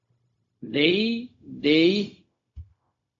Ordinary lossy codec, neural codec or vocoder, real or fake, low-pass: AAC, 48 kbps; codec, 16 kHz, 0.4 kbps, LongCat-Audio-Codec; fake; 7.2 kHz